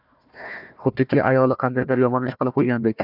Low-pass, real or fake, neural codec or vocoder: 5.4 kHz; fake; codec, 16 kHz, 1 kbps, FunCodec, trained on Chinese and English, 50 frames a second